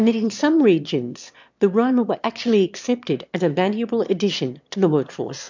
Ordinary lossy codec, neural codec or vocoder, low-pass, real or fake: MP3, 64 kbps; autoencoder, 22.05 kHz, a latent of 192 numbers a frame, VITS, trained on one speaker; 7.2 kHz; fake